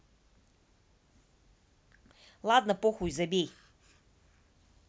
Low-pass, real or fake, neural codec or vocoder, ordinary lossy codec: none; real; none; none